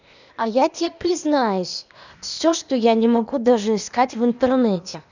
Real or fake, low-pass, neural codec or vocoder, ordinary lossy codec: fake; 7.2 kHz; codec, 16 kHz, 0.8 kbps, ZipCodec; none